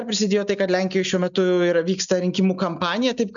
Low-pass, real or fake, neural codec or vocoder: 7.2 kHz; real; none